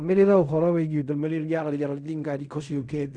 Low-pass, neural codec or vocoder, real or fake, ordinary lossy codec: 9.9 kHz; codec, 16 kHz in and 24 kHz out, 0.4 kbps, LongCat-Audio-Codec, fine tuned four codebook decoder; fake; none